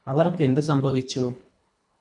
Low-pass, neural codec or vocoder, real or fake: 10.8 kHz; codec, 24 kHz, 1.5 kbps, HILCodec; fake